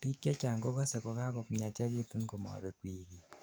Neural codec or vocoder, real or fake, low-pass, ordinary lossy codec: codec, 44.1 kHz, 7.8 kbps, DAC; fake; none; none